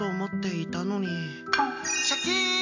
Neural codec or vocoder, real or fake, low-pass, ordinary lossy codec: none; real; 7.2 kHz; AAC, 48 kbps